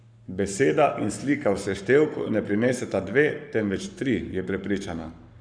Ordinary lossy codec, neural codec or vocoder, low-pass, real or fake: none; codec, 44.1 kHz, 7.8 kbps, Pupu-Codec; 9.9 kHz; fake